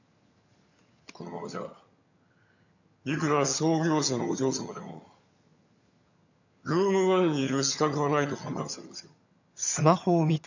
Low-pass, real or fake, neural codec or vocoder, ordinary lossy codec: 7.2 kHz; fake; vocoder, 22.05 kHz, 80 mel bands, HiFi-GAN; none